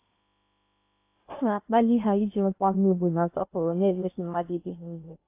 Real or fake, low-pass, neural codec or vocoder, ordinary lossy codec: fake; 3.6 kHz; codec, 16 kHz in and 24 kHz out, 0.8 kbps, FocalCodec, streaming, 65536 codes; AAC, 24 kbps